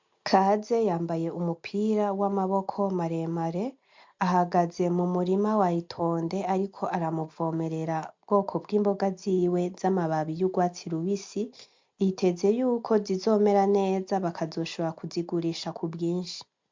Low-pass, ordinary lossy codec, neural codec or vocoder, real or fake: 7.2 kHz; MP3, 64 kbps; none; real